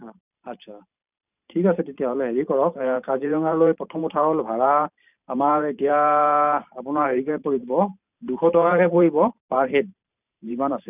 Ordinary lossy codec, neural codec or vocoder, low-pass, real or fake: none; vocoder, 44.1 kHz, 128 mel bands every 512 samples, BigVGAN v2; 3.6 kHz; fake